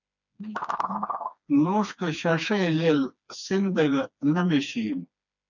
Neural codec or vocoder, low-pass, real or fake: codec, 16 kHz, 2 kbps, FreqCodec, smaller model; 7.2 kHz; fake